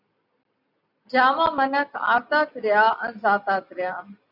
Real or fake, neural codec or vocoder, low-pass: real; none; 5.4 kHz